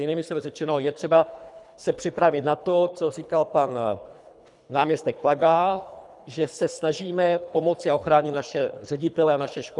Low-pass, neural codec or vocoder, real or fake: 10.8 kHz; codec, 24 kHz, 3 kbps, HILCodec; fake